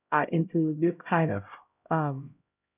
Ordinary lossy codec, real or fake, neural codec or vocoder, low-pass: none; fake; codec, 16 kHz, 0.5 kbps, X-Codec, HuBERT features, trained on LibriSpeech; 3.6 kHz